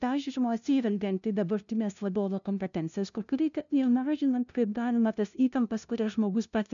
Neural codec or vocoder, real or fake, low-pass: codec, 16 kHz, 0.5 kbps, FunCodec, trained on LibriTTS, 25 frames a second; fake; 7.2 kHz